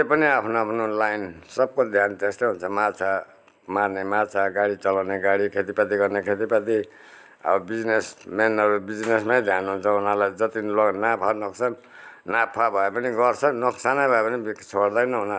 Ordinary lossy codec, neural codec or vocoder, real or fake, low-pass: none; none; real; none